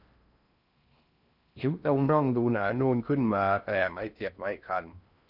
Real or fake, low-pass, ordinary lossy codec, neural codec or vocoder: fake; 5.4 kHz; none; codec, 16 kHz in and 24 kHz out, 0.6 kbps, FocalCodec, streaming, 4096 codes